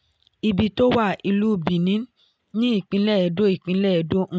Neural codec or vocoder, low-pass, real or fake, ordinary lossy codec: none; none; real; none